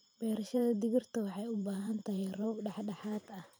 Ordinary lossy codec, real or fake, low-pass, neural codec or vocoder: none; fake; none; vocoder, 44.1 kHz, 128 mel bands every 512 samples, BigVGAN v2